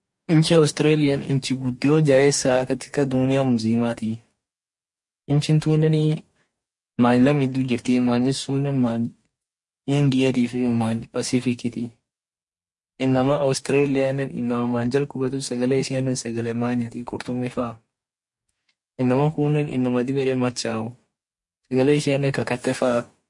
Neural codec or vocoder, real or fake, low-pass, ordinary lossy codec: codec, 44.1 kHz, 2.6 kbps, DAC; fake; 10.8 kHz; MP3, 48 kbps